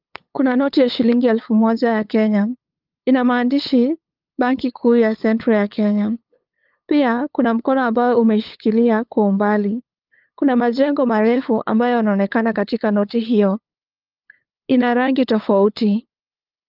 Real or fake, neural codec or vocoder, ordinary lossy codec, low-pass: fake; codec, 16 kHz, 8 kbps, FunCodec, trained on LibriTTS, 25 frames a second; Opus, 24 kbps; 5.4 kHz